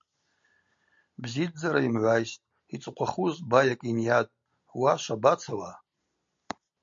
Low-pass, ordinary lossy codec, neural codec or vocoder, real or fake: 7.2 kHz; MP3, 48 kbps; none; real